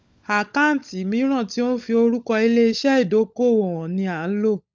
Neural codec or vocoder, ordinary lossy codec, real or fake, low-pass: codec, 16 kHz, 16 kbps, FunCodec, trained on LibriTTS, 50 frames a second; none; fake; none